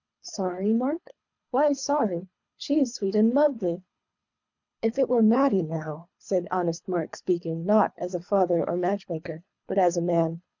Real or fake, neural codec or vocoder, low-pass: fake; codec, 24 kHz, 3 kbps, HILCodec; 7.2 kHz